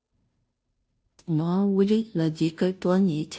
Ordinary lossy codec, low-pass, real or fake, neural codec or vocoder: none; none; fake; codec, 16 kHz, 0.5 kbps, FunCodec, trained on Chinese and English, 25 frames a second